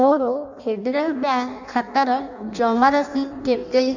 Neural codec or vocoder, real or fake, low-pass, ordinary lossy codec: codec, 16 kHz in and 24 kHz out, 0.6 kbps, FireRedTTS-2 codec; fake; 7.2 kHz; none